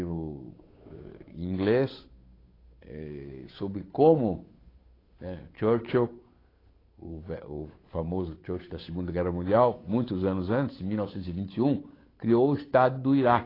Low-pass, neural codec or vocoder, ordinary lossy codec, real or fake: 5.4 kHz; codec, 16 kHz, 8 kbps, FunCodec, trained on Chinese and English, 25 frames a second; AAC, 24 kbps; fake